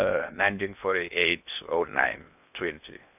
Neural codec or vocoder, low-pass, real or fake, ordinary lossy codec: codec, 16 kHz in and 24 kHz out, 0.6 kbps, FocalCodec, streaming, 2048 codes; 3.6 kHz; fake; none